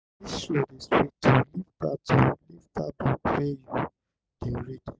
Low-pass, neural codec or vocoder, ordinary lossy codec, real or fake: none; none; none; real